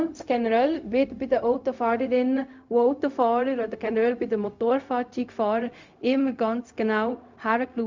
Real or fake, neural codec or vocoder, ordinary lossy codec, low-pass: fake; codec, 16 kHz, 0.4 kbps, LongCat-Audio-Codec; MP3, 64 kbps; 7.2 kHz